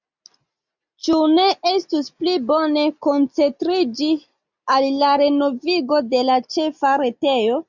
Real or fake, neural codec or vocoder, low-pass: real; none; 7.2 kHz